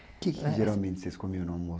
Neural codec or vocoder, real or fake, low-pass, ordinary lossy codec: none; real; none; none